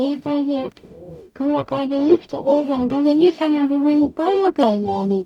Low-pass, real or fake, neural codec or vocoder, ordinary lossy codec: 19.8 kHz; fake; codec, 44.1 kHz, 0.9 kbps, DAC; none